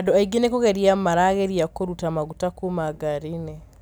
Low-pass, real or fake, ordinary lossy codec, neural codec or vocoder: none; real; none; none